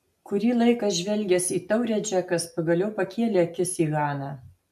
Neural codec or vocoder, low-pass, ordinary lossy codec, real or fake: none; 14.4 kHz; AAC, 96 kbps; real